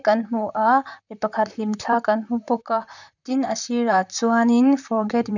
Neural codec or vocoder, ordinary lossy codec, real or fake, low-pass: vocoder, 44.1 kHz, 128 mel bands, Pupu-Vocoder; none; fake; 7.2 kHz